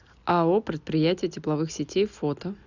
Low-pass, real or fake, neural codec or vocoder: 7.2 kHz; real; none